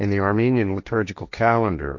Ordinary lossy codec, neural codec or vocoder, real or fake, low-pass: MP3, 48 kbps; codec, 16 kHz, 1.1 kbps, Voila-Tokenizer; fake; 7.2 kHz